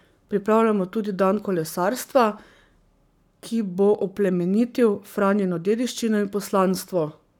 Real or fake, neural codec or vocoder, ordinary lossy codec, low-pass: fake; codec, 44.1 kHz, 7.8 kbps, Pupu-Codec; none; 19.8 kHz